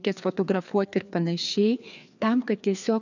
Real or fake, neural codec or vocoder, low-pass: fake; codec, 16 kHz, 2 kbps, FreqCodec, larger model; 7.2 kHz